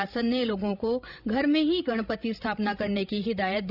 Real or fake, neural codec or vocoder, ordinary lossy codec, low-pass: fake; codec, 16 kHz, 16 kbps, FreqCodec, larger model; none; 5.4 kHz